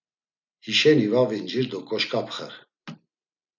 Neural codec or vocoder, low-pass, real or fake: none; 7.2 kHz; real